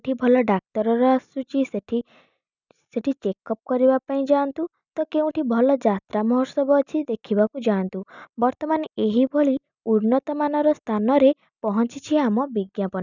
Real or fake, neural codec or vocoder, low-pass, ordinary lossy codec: real; none; 7.2 kHz; none